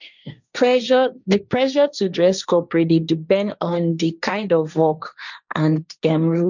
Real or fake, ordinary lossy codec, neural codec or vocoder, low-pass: fake; none; codec, 16 kHz, 1.1 kbps, Voila-Tokenizer; 7.2 kHz